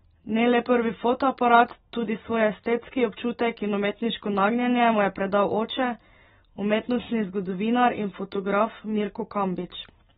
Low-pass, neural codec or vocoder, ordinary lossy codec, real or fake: 19.8 kHz; none; AAC, 16 kbps; real